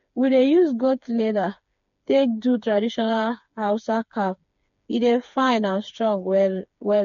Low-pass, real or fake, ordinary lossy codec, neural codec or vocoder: 7.2 kHz; fake; MP3, 48 kbps; codec, 16 kHz, 4 kbps, FreqCodec, smaller model